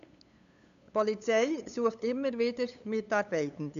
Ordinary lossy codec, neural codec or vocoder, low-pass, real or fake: MP3, 96 kbps; codec, 16 kHz, 8 kbps, FunCodec, trained on LibriTTS, 25 frames a second; 7.2 kHz; fake